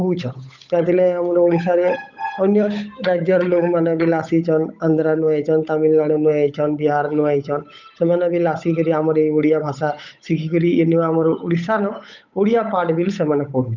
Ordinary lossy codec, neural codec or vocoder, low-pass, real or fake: none; codec, 16 kHz, 8 kbps, FunCodec, trained on Chinese and English, 25 frames a second; 7.2 kHz; fake